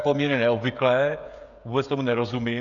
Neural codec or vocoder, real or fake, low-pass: codec, 16 kHz, 16 kbps, FreqCodec, smaller model; fake; 7.2 kHz